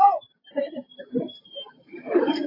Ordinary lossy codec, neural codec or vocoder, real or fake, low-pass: AAC, 24 kbps; none; real; 5.4 kHz